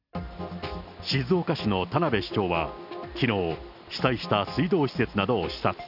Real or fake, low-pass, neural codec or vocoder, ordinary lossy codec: real; 5.4 kHz; none; none